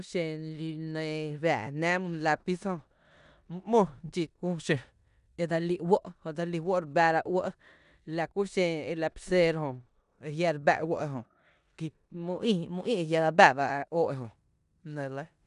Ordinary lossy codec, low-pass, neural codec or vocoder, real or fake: none; 10.8 kHz; codec, 16 kHz in and 24 kHz out, 0.9 kbps, LongCat-Audio-Codec, four codebook decoder; fake